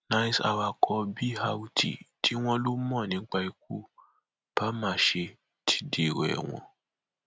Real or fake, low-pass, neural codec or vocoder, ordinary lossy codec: real; none; none; none